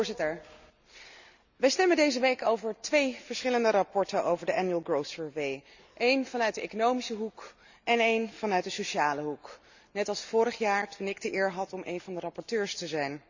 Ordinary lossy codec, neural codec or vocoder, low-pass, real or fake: Opus, 64 kbps; none; 7.2 kHz; real